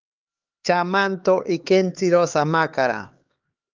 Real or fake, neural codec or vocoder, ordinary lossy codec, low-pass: fake; codec, 16 kHz, 2 kbps, X-Codec, HuBERT features, trained on LibriSpeech; Opus, 32 kbps; 7.2 kHz